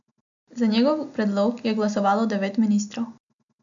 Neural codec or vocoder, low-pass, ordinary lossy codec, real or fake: none; 7.2 kHz; MP3, 64 kbps; real